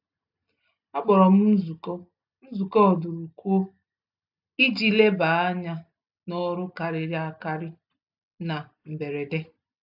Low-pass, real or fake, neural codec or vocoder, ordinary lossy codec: 5.4 kHz; real; none; AAC, 48 kbps